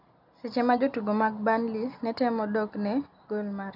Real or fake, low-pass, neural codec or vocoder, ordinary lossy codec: real; 5.4 kHz; none; none